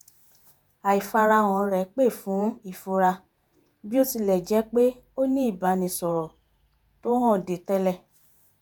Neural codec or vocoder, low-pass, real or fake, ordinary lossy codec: vocoder, 48 kHz, 128 mel bands, Vocos; none; fake; none